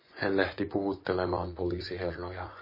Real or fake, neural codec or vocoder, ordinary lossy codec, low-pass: fake; vocoder, 24 kHz, 100 mel bands, Vocos; MP3, 24 kbps; 5.4 kHz